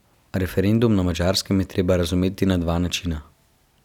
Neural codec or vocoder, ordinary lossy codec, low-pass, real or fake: none; none; 19.8 kHz; real